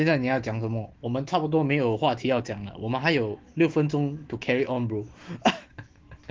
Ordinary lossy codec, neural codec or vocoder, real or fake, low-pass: Opus, 16 kbps; autoencoder, 48 kHz, 128 numbers a frame, DAC-VAE, trained on Japanese speech; fake; 7.2 kHz